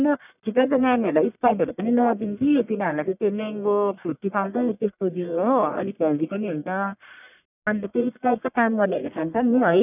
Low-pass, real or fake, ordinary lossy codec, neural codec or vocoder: 3.6 kHz; fake; none; codec, 44.1 kHz, 1.7 kbps, Pupu-Codec